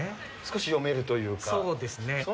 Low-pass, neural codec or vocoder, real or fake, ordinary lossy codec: none; none; real; none